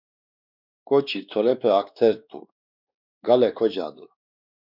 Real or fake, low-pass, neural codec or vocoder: fake; 5.4 kHz; codec, 16 kHz, 2 kbps, X-Codec, WavLM features, trained on Multilingual LibriSpeech